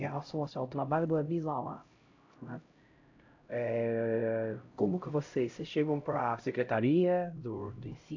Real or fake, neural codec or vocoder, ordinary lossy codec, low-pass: fake; codec, 16 kHz, 0.5 kbps, X-Codec, HuBERT features, trained on LibriSpeech; AAC, 48 kbps; 7.2 kHz